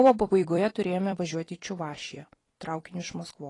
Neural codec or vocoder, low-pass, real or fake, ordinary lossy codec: vocoder, 44.1 kHz, 128 mel bands every 256 samples, BigVGAN v2; 10.8 kHz; fake; AAC, 32 kbps